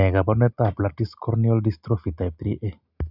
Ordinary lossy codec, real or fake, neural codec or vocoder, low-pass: none; real; none; 5.4 kHz